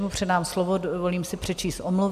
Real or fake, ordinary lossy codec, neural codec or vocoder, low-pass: real; AAC, 96 kbps; none; 14.4 kHz